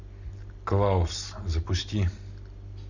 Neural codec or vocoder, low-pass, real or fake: none; 7.2 kHz; real